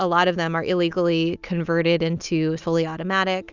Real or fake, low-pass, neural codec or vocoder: real; 7.2 kHz; none